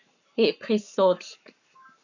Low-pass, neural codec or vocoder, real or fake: 7.2 kHz; codec, 44.1 kHz, 7.8 kbps, Pupu-Codec; fake